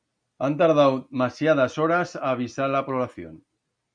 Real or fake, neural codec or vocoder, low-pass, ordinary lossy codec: real; none; 9.9 kHz; MP3, 96 kbps